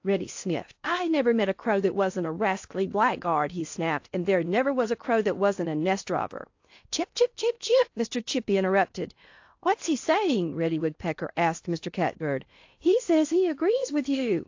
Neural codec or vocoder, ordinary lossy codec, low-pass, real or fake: codec, 16 kHz in and 24 kHz out, 0.8 kbps, FocalCodec, streaming, 65536 codes; AAC, 48 kbps; 7.2 kHz; fake